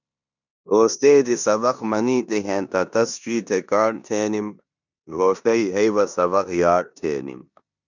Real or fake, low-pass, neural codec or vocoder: fake; 7.2 kHz; codec, 16 kHz in and 24 kHz out, 0.9 kbps, LongCat-Audio-Codec, four codebook decoder